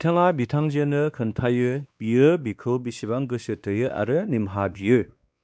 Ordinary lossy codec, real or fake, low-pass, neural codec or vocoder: none; fake; none; codec, 16 kHz, 2 kbps, X-Codec, WavLM features, trained on Multilingual LibriSpeech